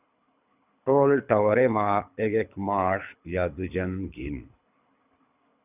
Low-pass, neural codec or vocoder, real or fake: 3.6 kHz; codec, 24 kHz, 6 kbps, HILCodec; fake